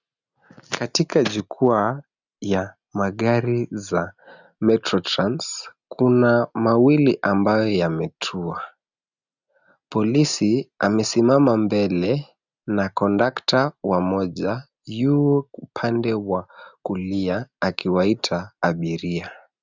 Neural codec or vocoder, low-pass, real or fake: none; 7.2 kHz; real